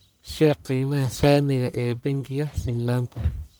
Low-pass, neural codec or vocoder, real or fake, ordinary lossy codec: none; codec, 44.1 kHz, 1.7 kbps, Pupu-Codec; fake; none